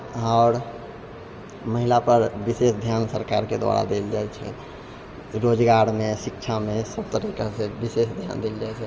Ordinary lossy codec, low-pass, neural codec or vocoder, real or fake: Opus, 24 kbps; 7.2 kHz; none; real